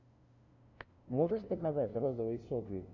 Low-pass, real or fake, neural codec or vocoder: 7.2 kHz; fake; codec, 16 kHz, 0.5 kbps, FunCodec, trained on LibriTTS, 25 frames a second